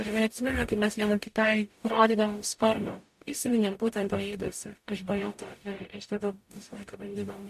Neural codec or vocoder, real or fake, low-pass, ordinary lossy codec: codec, 44.1 kHz, 0.9 kbps, DAC; fake; 14.4 kHz; MP3, 64 kbps